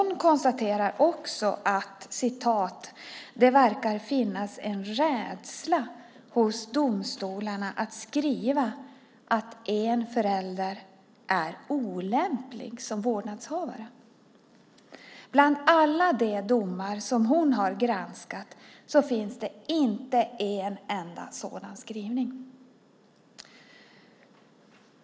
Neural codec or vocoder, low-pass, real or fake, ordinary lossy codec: none; none; real; none